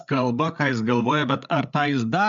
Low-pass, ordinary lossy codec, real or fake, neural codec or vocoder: 7.2 kHz; MP3, 64 kbps; fake; codec, 16 kHz, 8 kbps, FreqCodec, larger model